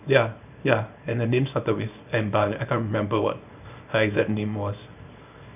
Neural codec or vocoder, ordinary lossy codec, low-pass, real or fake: codec, 24 kHz, 0.9 kbps, WavTokenizer, small release; none; 3.6 kHz; fake